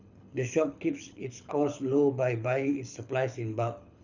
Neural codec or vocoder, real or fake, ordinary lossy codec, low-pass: codec, 24 kHz, 6 kbps, HILCodec; fake; none; 7.2 kHz